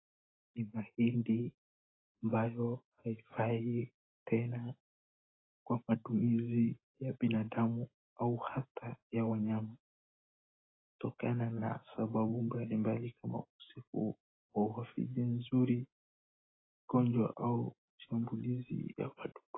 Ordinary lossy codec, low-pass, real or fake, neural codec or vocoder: AAC, 16 kbps; 7.2 kHz; real; none